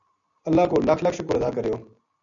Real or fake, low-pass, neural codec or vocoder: real; 7.2 kHz; none